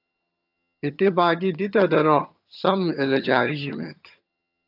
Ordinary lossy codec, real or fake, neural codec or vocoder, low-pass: AAC, 48 kbps; fake; vocoder, 22.05 kHz, 80 mel bands, HiFi-GAN; 5.4 kHz